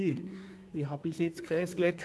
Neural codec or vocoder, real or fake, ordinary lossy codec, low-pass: codec, 24 kHz, 1 kbps, SNAC; fake; none; none